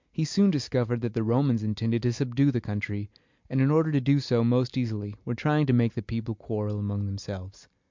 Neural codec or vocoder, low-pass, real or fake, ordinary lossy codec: none; 7.2 kHz; real; MP3, 64 kbps